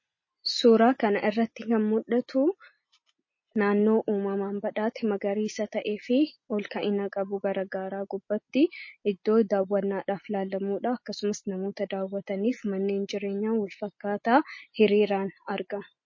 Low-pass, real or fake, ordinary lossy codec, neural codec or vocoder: 7.2 kHz; real; MP3, 32 kbps; none